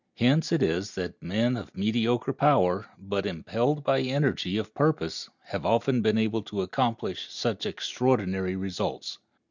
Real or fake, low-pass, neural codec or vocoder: real; 7.2 kHz; none